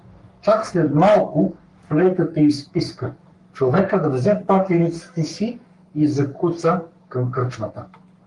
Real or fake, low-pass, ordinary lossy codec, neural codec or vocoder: fake; 10.8 kHz; Opus, 32 kbps; codec, 44.1 kHz, 3.4 kbps, Pupu-Codec